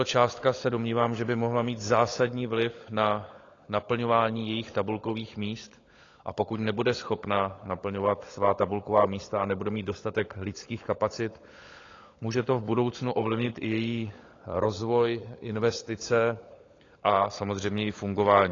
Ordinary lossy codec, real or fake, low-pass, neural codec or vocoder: AAC, 32 kbps; fake; 7.2 kHz; codec, 16 kHz, 16 kbps, FunCodec, trained on LibriTTS, 50 frames a second